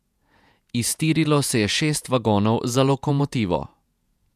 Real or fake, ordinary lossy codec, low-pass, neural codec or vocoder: fake; none; 14.4 kHz; vocoder, 48 kHz, 128 mel bands, Vocos